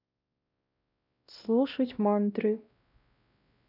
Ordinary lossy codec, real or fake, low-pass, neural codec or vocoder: none; fake; 5.4 kHz; codec, 16 kHz, 1 kbps, X-Codec, WavLM features, trained on Multilingual LibriSpeech